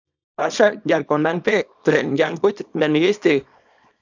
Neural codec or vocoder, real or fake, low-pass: codec, 24 kHz, 0.9 kbps, WavTokenizer, small release; fake; 7.2 kHz